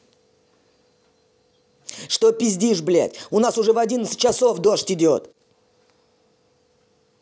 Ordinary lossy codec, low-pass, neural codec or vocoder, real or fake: none; none; none; real